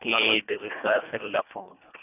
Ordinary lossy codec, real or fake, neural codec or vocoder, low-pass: none; fake; codec, 24 kHz, 1.5 kbps, HILCodec; 3.6 kHz